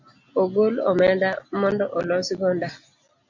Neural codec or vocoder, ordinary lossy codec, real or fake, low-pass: none; MP3, 48 kbps; real; 7.2 kHz